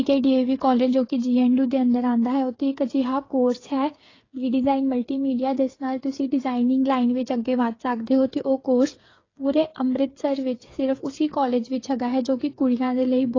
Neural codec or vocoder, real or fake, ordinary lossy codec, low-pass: codec, 16 kHz, 8 kbps, FreqCodec, smaller model; fake; AAC, 32 kbps; 7.2 kHz